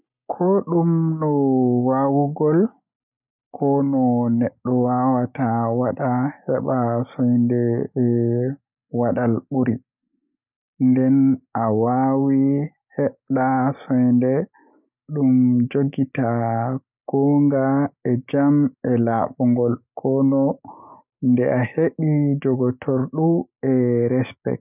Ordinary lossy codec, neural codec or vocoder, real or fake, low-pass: none; none; real; 3.6 kHz